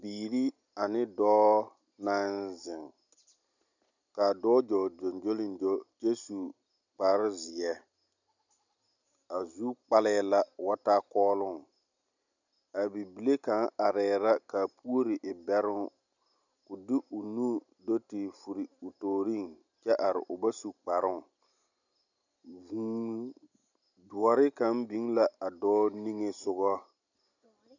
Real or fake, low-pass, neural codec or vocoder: real; 7.2 kHz; none